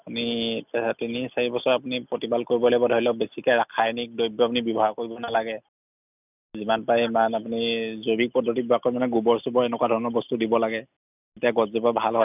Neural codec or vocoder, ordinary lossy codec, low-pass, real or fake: none; none; 3.6 kHz; real